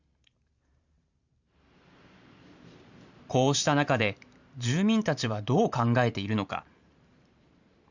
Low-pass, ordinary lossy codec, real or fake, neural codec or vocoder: 7.2 kHz; Opus, 64 kbps; fake; vocoder, 44.1 kHz, 128 mel bands every 512 samples, BigVGAN v2